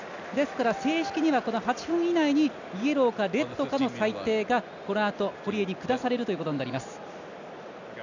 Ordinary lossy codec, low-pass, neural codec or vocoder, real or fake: none; 7.2 kHz; none; real